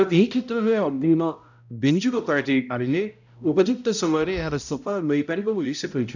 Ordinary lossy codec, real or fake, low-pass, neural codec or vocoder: none; fake; 7.2 kHz; codec, 16 kHz, 0.5 kbps, X-Codec, HuBERT features, trained on balanced general audio